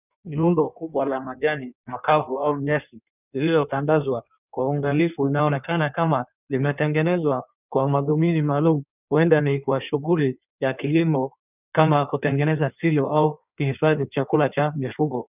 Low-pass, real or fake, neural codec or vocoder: 3.6 kHz; fake; codec, 16 kHz in and 24 kHz out, 1.1 kbps, FireRedTTS-2 codec